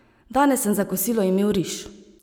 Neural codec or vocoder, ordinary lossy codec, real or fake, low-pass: none; none; real; none